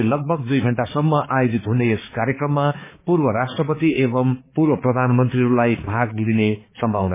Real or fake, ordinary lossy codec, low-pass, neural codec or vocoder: fake; MP3, 16 kbps; 3.6 kHz; codec, 16 kHz, 4 kbps, X-Codec, HuBERT features, trained on balanced general audio